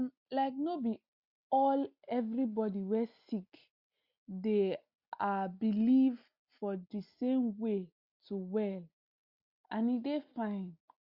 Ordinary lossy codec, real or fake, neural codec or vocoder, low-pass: Opus, 64 kbps; real; none; 5.4 kHz